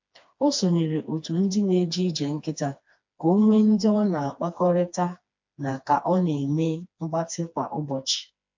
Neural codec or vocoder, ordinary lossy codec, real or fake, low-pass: codec, 16 kHz, 2 kbps, FreqCodec, smaller model; MP3, 48 kbps; fake; 7.2 kHz